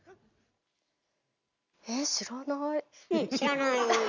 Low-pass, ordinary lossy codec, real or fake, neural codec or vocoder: 7.2 kHz; none; real; none